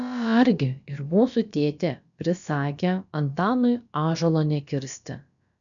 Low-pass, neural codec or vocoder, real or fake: 7.2 kHz; codec, 16 kHz, about 1 kbps, DyCAST, with the encoder's durations; fake